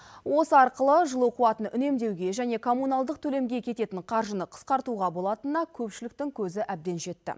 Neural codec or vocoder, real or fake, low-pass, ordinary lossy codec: none; real; none; none